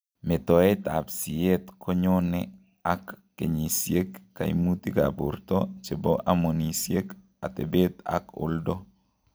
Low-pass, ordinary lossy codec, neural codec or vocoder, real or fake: none; none; none; real